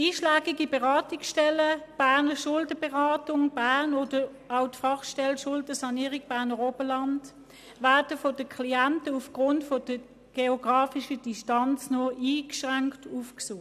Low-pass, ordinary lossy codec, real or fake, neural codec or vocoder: 14.4 kHz; none; real; none